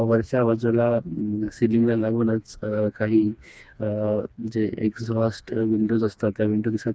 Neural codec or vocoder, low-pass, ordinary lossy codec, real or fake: codec, 16 kHz, 2 kbps, FreqCodec, smaller model; none; none; fake